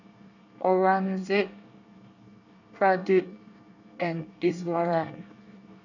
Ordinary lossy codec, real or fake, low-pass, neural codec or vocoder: none; fake; 7.2 kHz; codec, 24 kHz, 1 kbps, SNAC